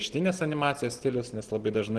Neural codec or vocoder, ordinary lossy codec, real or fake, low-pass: none; Opus, 16 kbps; real; 10.8 kHz